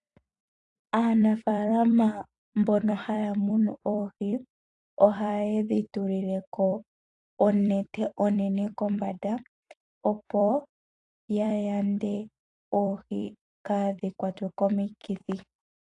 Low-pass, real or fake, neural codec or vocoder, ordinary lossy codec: 10.8 kHz; fake; vocoder, 44.1 kHz, 128 mel bands every 256 samples, BigVGAN v2; AAC, 48 kbps